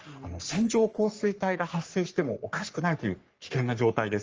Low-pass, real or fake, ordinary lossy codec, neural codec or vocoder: 7.2 kHz; fake; Opus, 24 kbps; codec, 44.1 kHz, 3.4 kbps, Pupu-Codec